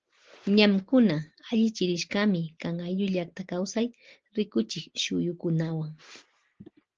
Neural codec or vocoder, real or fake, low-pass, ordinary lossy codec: none; real; 7.2 kHz; Opus, 16 kbps